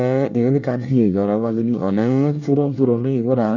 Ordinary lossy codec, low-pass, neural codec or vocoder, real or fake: none; 7.2 kHz; codec, 24 kHz, 1 kbps, SNAC; fake